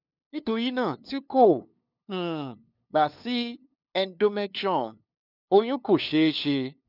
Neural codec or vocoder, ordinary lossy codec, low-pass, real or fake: codec, 16 kHz, 2 kbps, FunCodec, trained on LibriTTS, 25 frames a second; none; 5.4 kHz; fake